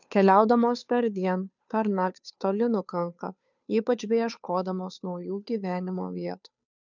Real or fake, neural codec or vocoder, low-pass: fake; codec, 16 kHz, 2 kbps, FunCodec, trained on Chinese and English, 25 frames a second; 7.2 kHz